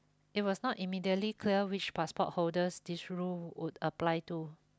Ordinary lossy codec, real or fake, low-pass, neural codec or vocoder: none; real; none; none